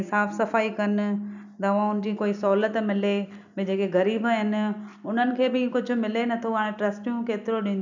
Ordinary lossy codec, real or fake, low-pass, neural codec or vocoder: none; real; 7.2 kHz; none